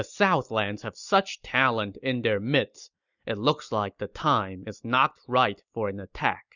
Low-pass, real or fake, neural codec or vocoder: 7.2 kHz; real; none